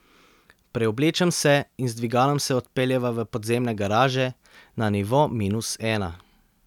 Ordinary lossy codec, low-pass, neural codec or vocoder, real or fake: none; 19.8 kHz; none; real